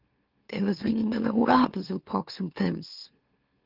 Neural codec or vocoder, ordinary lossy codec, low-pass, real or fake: autoencoder, 44.1 kHz, a latent of 192 numbers a frame, MeloTTS; Opus, 32 kbps; 5.4 kHz; fake